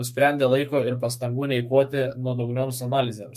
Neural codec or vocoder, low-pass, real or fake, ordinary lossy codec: codec, 32 kHz, 1.9 kbps, SNAC; 14.4 kHz; fake; MP3, 64 kbps